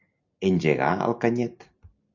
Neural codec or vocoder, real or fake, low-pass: none; real; 7.2 kHz